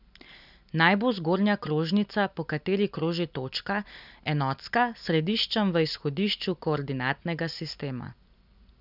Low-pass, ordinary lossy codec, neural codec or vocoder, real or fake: 5.4 kHz; none; none; real